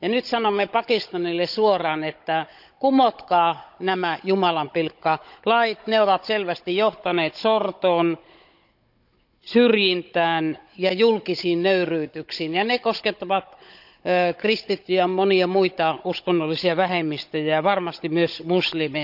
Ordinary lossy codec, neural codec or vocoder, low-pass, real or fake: none; codec, 16 kHz, 16 kbps, FunCodec, trained on Chinese and English, 50 frames a second; 5.4 kHz; fake